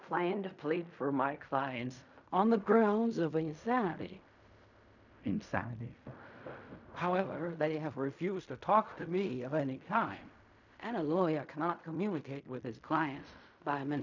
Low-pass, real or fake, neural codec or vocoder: 7.2 kHz; fake; codec, 16 kHz in and 24 kHz out, 0.4 kbps, LongCat-Audio-Codec, fine tuned four codebook decoder